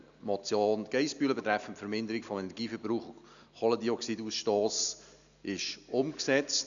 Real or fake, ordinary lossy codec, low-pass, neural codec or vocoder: real; none; 7.2 kHz; none